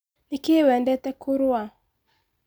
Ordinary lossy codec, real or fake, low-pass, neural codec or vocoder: none; real; none; none